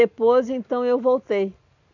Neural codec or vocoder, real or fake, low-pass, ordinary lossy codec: none; real; 7.2 kHz; none